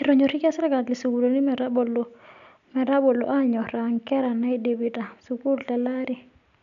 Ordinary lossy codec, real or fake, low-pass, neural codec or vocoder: none; real; 7.2 kHz; none